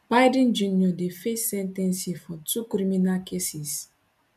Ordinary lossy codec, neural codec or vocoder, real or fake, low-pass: none; none; real; 14.4 kHz